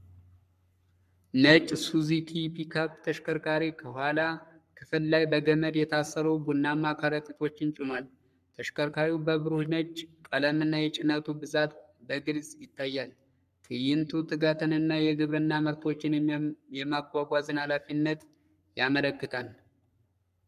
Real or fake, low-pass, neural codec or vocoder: fake; 14.4 kHz; codec, 44.1 kHz, 3.4 kbps, Pupu-Codec